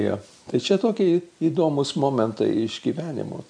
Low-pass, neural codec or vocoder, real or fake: 9.9 kHz; none; real